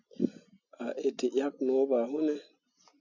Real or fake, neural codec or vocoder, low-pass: real; none; 7.2 kHz